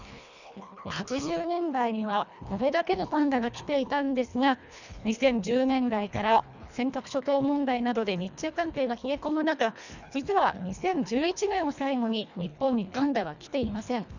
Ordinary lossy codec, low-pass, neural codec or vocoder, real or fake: none; 7.2 kHz; codec, 24 kHz, 1.5 kbps, HILCodec; fake